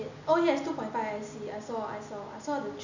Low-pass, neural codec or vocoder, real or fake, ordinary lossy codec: 7.2 kHz; none; real; none